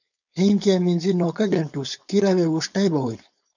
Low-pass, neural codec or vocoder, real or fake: 7.2 kHz; codec, 16 kHz, 4.8 kbps, FACodec; fake